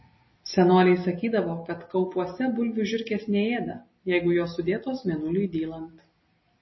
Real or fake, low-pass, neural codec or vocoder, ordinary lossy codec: real; 7.2 kHz; none; MP3, 24 kbps